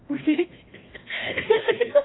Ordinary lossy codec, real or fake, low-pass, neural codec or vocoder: AAC, 16 kbps; fake; 7.2 kHz; codec, 16 kHz, 1 kbps, FreqCodec, larger model